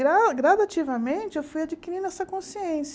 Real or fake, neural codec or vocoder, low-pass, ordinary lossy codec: real; none; none; none